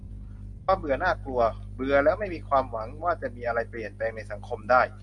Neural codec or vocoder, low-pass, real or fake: none; 10.8 kHz; real